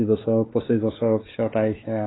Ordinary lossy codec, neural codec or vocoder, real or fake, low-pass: AAC, 16 kbps; codec, 16 kHz, 4 kbps, X-Codec, WavLM features, trained on Multilingual LibriSpeech; fake; 7.2 kHz